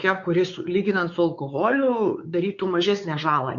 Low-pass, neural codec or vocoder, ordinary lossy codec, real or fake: 7.2 kHz; codec, 16 kHz, 4 kbps, X-Codec, WavLM features, trained on Multilingual LibriSpeech; Opus, 64 kbps; fake